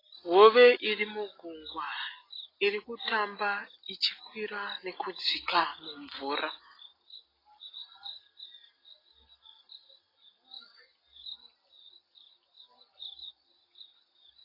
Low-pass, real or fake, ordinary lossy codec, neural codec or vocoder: 5.4 kHz; real; AAC, 24 kbps; none